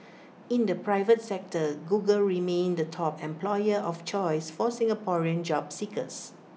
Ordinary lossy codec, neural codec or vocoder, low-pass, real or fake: none; none; none; real